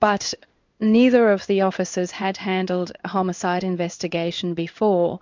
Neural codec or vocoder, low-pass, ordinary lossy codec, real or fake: codec, 16 kHz in and 24 kHz out, 1 kbps, XY-Tokenizer; 7.2 kHz; MP3, 64 kbps; fake